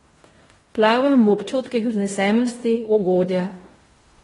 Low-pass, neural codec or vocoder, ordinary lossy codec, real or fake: 10.8 kHz; codec, 16 kHz in and 24 kHz out, 0.9 kbps, LongCat-Audio-Codec, fine tuned four codebook decoder; AAC, 32 kbps; fake